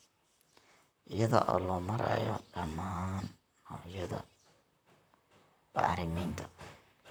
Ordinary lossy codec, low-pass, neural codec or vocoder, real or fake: none; none; vocoder, 44.1 kHz, 128 mel bands, Pupu-Vocoder; fake